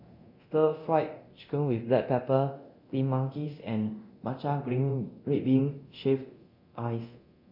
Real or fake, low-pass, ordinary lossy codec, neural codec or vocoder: fake; 5.4 kHz; MP3, 32 kbps; codec, 24 kHz, 0.9 kbps, DualCodec